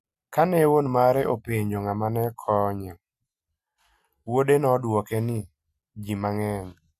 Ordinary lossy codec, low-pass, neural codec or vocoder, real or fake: MP3, 96 kbps; 14.4 kHz; none; real